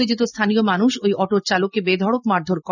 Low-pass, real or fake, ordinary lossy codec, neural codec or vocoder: 7.2 kHz; real; none; none